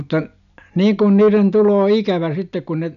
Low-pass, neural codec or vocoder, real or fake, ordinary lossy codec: 7.2 kHz; none; real; none